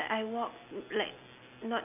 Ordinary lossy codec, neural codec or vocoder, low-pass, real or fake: none; none; 3.6 kHz; real